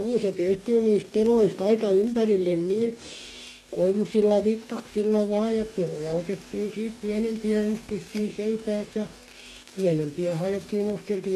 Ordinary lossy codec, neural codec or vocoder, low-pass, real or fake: none; codec, 44.1 kHz, 2.6 kbps, DAC; 14.4 kHz; fake